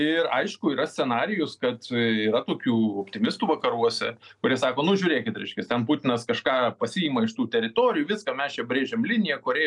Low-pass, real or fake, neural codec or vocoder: 10.8 kHz; real; none